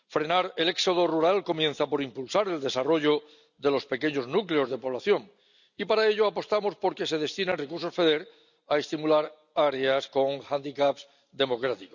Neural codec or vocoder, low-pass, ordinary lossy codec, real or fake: none; 7.2 kHz; none; real